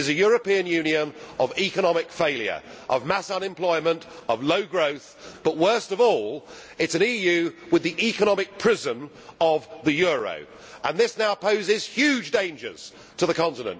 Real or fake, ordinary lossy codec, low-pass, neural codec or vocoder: real; none; none; none